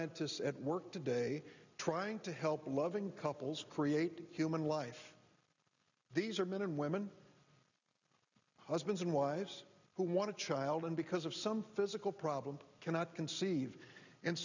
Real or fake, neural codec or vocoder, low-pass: real; none; 7.2 kHz